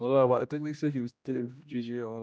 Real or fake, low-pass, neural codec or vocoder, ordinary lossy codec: fake; none; codec, 16 kHz, 1 kbps, X-Codec, HuBERT features, trained on general audio; none